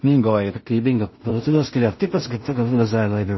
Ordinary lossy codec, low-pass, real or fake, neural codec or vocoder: MP3, 24 kbps; 7.2 kHz; fake; codec, 16 kHz in and 24 kHz out, 0.4 kbps, LongCat-Audio-Codec, two codebook decoder